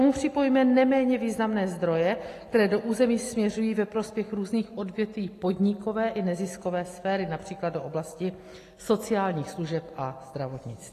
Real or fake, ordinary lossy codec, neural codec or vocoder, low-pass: real; AAC, 48 kbps; none; 14.4 kHz